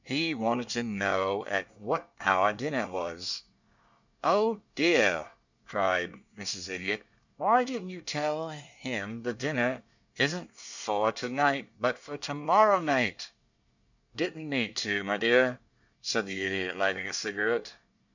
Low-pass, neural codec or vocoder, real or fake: 7.2 kHz; codec, 24 kHz, 1 kbps, SNAC; fake